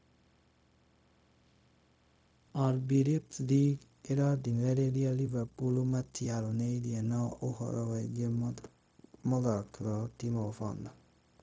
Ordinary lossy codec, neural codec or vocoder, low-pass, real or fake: none; codec, 16 kHz, 0.4 kbps, LongCat-Audio-Codec; none; fake